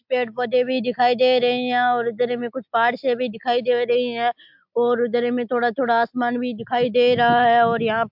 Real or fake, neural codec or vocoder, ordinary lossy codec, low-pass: real; none; MP3, 48 kbps; 5.4 kHz